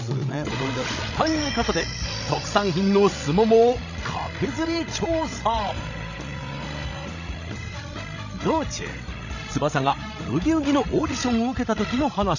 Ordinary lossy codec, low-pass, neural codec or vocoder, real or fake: none; 7.2 kHz; codec, 16 kHz, 16 kbps, FreqCodec, larger model; fake